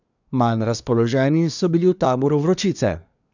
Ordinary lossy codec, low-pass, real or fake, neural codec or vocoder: none; 7.2 kHz; fake; codec, 24 kHz, 1 kbps, SNAC